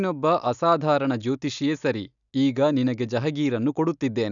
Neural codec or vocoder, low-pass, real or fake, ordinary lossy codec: none; 7.2 kHz; real; none